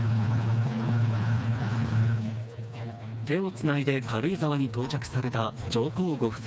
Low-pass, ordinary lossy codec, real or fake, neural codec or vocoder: none; none; fake; codec, 16 kHz, 2 kbps, FreqCodec, smaller model